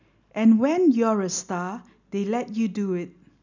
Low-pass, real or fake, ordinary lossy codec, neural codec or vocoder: 7.2 kHz; real; none; none